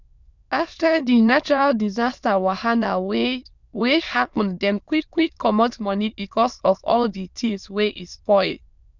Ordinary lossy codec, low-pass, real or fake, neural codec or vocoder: none; 7.2 kHz; fake; autoencoder, 22.05 kHz, a latent of 192 numbers a frame, VITS, trained on many speakers